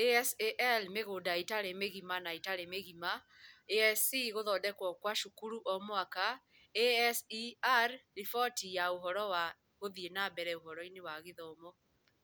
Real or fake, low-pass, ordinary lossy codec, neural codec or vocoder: real; none; none; none